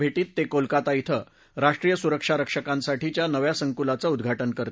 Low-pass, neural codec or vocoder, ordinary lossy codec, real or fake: none; none; none; real